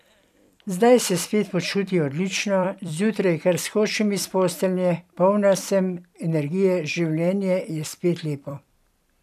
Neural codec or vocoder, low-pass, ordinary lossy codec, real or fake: vocoder, 44.1 kHz, 128 mel bands every 256 samples, BigVGAN v2; 14.4 kHz; none; fake